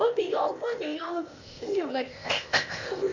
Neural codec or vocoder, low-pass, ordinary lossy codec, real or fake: codec, 16 kHz, 0.8 kbps, ZipCodec; 7.2 kHz; none; fake